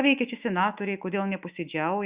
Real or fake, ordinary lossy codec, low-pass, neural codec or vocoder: fake; Opus, 64 kbps; 3.6 kHz; autoencoder, 48 kHz, 128 numbers a frame, DAC-VAE, trained on Japanese speech